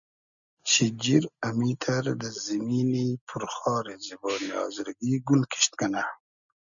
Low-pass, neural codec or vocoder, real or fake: 7.2 kHz; none; real